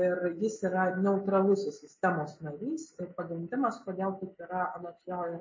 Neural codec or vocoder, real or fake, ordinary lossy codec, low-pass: none; real; MP3, 32 kbps; 7.2 kHz